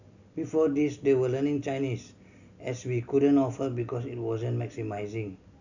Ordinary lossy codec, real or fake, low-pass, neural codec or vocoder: none; real; 7.2 kHz; none